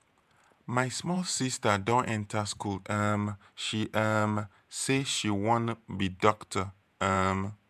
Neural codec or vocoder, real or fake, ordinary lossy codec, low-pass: vocoder, 48 kHz, 128 mel bands, Vocos; fake; MP3, 96 kbps; 14.4 kHz